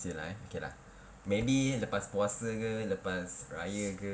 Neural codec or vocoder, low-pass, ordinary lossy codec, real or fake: none; none; none; real